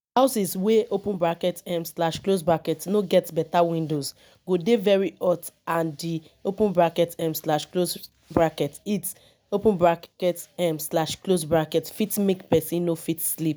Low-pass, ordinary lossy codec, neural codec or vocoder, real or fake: none; none; none; real